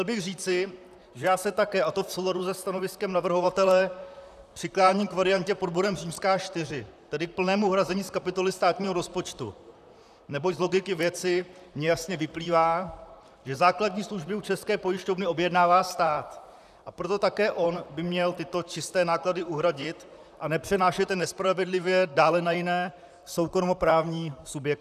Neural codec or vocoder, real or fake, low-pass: vocoder, 44.1 kHz, 128 mel bands, Pupu-Vocoder; fake; 14.4 kHz